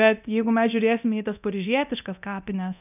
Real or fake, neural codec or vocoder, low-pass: fake; codec, 24 kHz, 0.9 kbps, DualCodec; 3.6 kHz